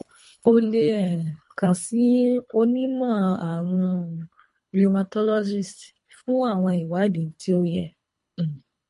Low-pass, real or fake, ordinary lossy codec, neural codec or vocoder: 10.8 kHz; fake; MP3, 48 kbps; codec, 24 kHz, 3 kbps, HILCodec